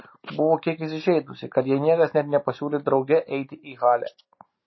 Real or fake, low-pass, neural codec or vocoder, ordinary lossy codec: real; 7.2 kHz; none; MP3, 24 kbps